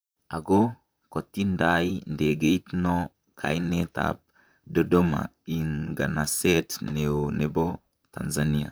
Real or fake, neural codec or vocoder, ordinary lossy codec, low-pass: fake; vocoder, 44.1 kHz, 128 mel bands, Pupu-Vocoder; none; none